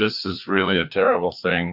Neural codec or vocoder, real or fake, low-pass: codec, 16 kHz, 2 kbps, FreqCodec, larger model; fake; 5.4 kHz